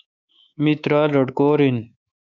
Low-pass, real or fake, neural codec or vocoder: 7.2 kHz; fake; codec, 24 kHz, 3.1 kbps, DualCodec